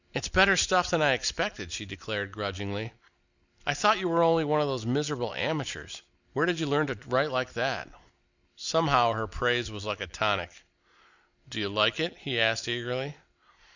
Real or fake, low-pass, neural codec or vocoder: real; 7.2 kHz; none